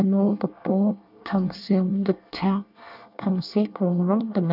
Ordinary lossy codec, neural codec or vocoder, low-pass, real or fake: none; codec, 24 kHz, 1 kbps, SNAC; 5.4 kHz; fake